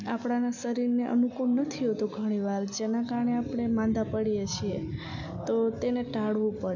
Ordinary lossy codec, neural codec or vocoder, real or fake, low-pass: none; none; real; 7.2 kHz